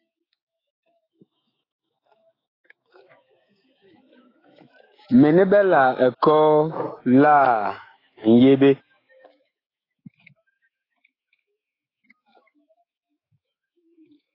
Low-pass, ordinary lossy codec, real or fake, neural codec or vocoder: 5.4 kHz; AAC, 24 kbps; fake; autoencoder, 48 kHz, 128 numbers a frame, DAC-VAE, trained on Japanese speech